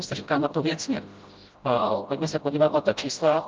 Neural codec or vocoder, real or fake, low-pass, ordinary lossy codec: codec, 16 kHz, 0.5 kbps, FreqCodec, smaller model; fake; 7.2 kHz; Opus, 24 kbps